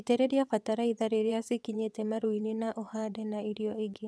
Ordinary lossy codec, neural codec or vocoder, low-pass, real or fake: none; vocoder, 22.05 kHz, 80 mel bands, WaveNeXt; none; fake